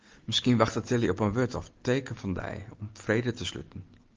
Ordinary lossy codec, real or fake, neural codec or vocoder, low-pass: Opus, 24 kbps; real; none; 7.2 kHz